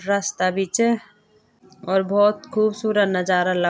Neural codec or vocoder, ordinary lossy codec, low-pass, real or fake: none; none; none; real